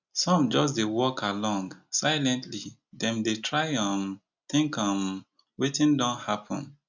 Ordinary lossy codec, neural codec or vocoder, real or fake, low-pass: none; none; real; 7.2 kHz